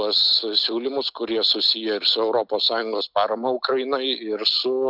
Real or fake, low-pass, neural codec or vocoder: real; 5.4 kHz; none